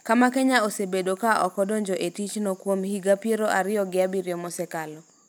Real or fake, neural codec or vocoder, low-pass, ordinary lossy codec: real; none; none; none